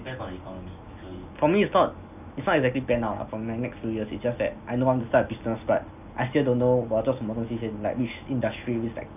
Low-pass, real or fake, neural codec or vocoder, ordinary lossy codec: 3.6 kHz; real; none; none